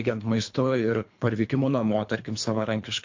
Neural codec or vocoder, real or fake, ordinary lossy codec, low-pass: codec, 24 kHz, 3 kbps, HILCodec; fake; MP3, 48 kbps; 7.2 kHz